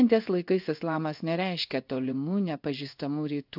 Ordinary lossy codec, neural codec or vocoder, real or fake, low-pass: AAC, 48 kbps; codec, 16 kHz in and 24 kHz out, 1 kbps, XY-Tokenizer; fake; 5.4 kHz